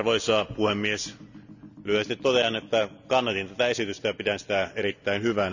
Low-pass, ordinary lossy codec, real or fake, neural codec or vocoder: 7.2 kHz; none; real; none